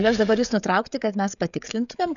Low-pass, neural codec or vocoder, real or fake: 7.2 kHz; codec, 16 kHz, 16 kbps, FreqCodec, smaller model; fake